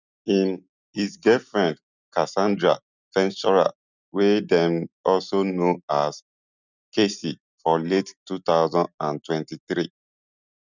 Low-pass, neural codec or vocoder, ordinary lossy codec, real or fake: 7.2 kHz; none; none; real